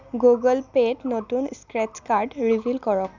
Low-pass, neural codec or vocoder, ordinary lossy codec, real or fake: 7.2 kHz; none; none; real